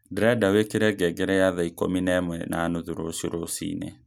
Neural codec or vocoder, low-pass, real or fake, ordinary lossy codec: none; 19.8 kHz; real; none